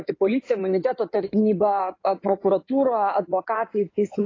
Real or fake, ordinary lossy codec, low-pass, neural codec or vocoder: fake; AAC, 32 kbps; 7.2 kHz; codec, 16 kHz in and 24 kHz out, 2.2 kbps, FireRedTTS-2 codec